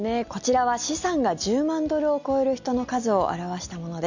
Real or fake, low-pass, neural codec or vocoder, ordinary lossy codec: real; 7.2 kHz; none; none